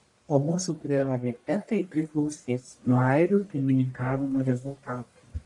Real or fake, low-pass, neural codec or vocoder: fake; 10.8 kHz; codec, 44.1 kHz, 1.7 kbps, Pupu-Codec